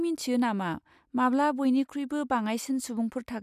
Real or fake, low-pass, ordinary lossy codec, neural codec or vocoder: real; 14.4 kHz; none; none